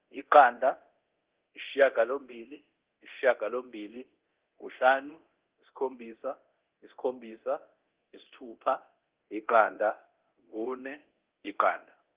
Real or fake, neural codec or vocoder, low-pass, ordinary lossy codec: fake; codec, 24 kHz, 0.9 kbps, DualCodec; 3.6 kHz; Opus, 16 kbps